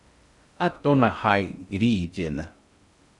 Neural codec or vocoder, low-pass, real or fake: codec, 16 kHz in and 24 kHz out, 0.6 kbps, FocalCodec, streaming, 2048 codes; 10.8 kHz; fake